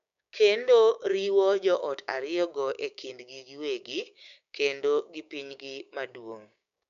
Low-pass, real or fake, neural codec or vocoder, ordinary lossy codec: 7.2 kHz; fake; codec, 16 kHz, 6 kbps, DAC; none